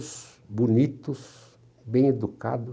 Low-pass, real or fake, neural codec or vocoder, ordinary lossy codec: none; real; none; none